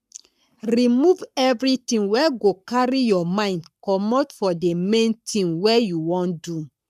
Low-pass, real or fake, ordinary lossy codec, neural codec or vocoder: 14.4 kHz; fake; none; codec, 44.1 kHz, 7.8 kbps, Pupu-Codec